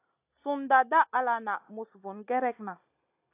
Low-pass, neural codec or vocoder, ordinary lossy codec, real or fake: 3.6 kHz; none; AAC, 24 kbps; real